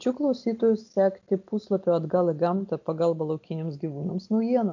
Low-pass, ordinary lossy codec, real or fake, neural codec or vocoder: 7.2 kHz; AAC, 48 kbps; real; none